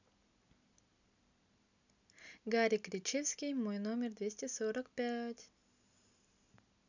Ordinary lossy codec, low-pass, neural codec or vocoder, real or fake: none; 7.2 kHz; none; real